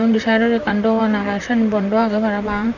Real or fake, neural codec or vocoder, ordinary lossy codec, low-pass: fake; vocoder, 44.1 kHz, 128 mel bands, Pupu-Vocoder; none; 7.2 kHz